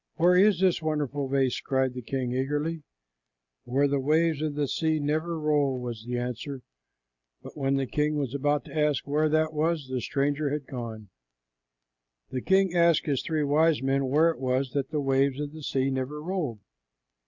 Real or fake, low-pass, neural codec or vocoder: real; 7.2 kHz; none